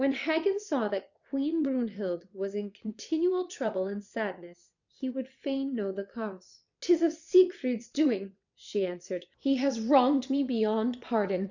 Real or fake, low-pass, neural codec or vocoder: fake; 7.2 kHz; vocoder, 22.05 kHz, 80 mel bands, WaveNeXt